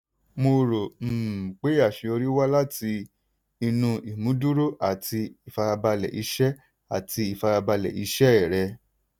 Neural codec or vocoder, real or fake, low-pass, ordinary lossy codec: none; real; none; none